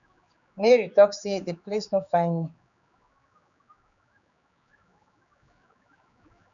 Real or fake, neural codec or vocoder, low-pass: fake; codec, 16 kHz, 4 kbps, X-Codec, HuBERT features, trained on general audio; 7.2 kHz